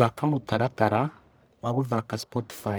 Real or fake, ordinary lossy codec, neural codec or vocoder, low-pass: fake; none; codec, 44.1 kHz, 1.7 kbps, Pupu-Codec; none